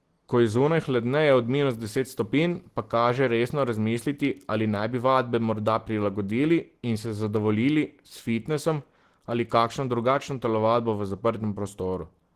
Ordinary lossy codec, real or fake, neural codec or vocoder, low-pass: Opus, 16 kbps; real; none; 14.4 kHz